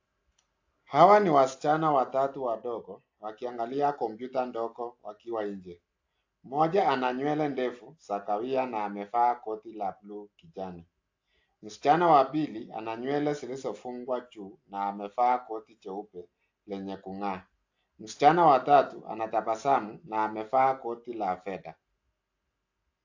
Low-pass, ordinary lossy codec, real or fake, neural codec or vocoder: 7.2 kHz; AAC, 48 kbps; real; none